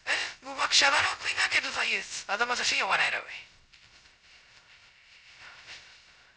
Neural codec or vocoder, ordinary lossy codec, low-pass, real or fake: codec, 16 kHz, 0.2 kbps, FocalCodec; none; none; fake